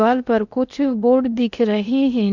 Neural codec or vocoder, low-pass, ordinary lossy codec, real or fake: codec, 16 kHz in and 24 kHz out, 0.8 kbps, FocalCodec, streaming, 65536 codes; 7.2 kHz; none; fake